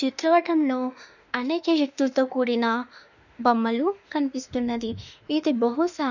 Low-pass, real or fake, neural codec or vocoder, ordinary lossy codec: 7.2 kHz; fake; autoencoder, 48 kHz, 32 numbers a frame, DAC-VAE, trained on Japanese speech; none